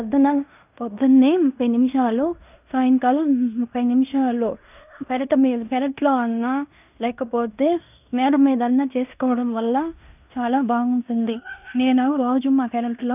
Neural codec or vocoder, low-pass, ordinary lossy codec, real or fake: codec, 16 kHz in and 24 kHz out, 0.9 kbps, LongCat-Audio-Codec, fine tuned four codebook decoder; 3.6 kHz; none; fake